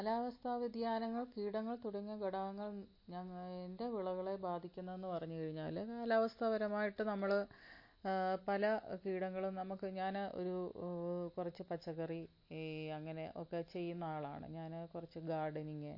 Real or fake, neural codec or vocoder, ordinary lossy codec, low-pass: real; none; MP3, 32 kbps; 5.4 kHz